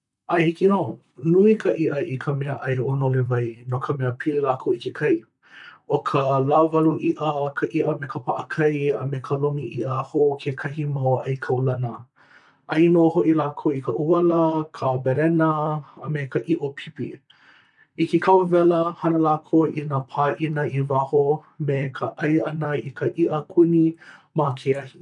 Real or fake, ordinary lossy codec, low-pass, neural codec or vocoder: fake; none; 10.8 kHz; vocoder, 44.1 kHz, 128 mel bands, Pupu-Vocoder